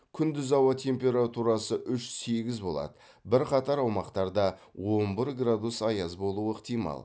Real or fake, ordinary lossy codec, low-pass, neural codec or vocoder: real; none; none; none